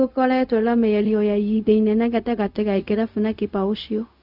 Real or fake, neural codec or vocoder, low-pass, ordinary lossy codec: fake; codec, 16 kHz, 0.4 kbps, LongCat-Audio-Codec; 5.4 kHz; none